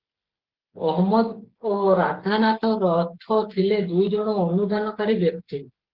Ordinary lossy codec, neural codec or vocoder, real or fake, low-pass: Opus, 16 kbps; codec, 16 kHz, 16 kbps, FreqCodec, smaller model; fake; 5.4 kHz